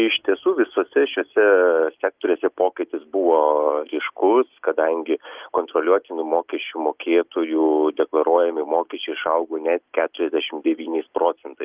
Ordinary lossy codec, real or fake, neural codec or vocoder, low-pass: Opus, 32 kbps; real; none; 3.6 kHz